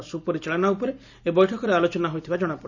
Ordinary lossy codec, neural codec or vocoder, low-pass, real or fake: none; none; 7.2 kHz; real